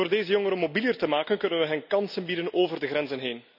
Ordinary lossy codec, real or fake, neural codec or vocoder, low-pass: none; real; none; 5.4 kHz